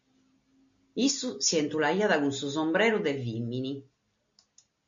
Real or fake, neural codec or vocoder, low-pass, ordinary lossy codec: real; none; 7.2 kHz; MP3, 48 kbps